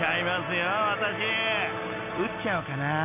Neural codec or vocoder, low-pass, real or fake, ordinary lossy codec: none; 3.6 kHz; real; none